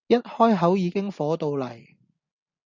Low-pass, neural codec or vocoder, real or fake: 7.2 kHz; none; real